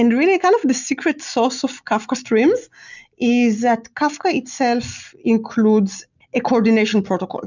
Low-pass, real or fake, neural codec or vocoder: 7.2 kHz; real; none